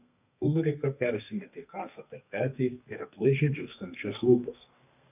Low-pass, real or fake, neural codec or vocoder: 3.6 kHz; fake; codec, 32 kHz, 1.9 kbps, SNAC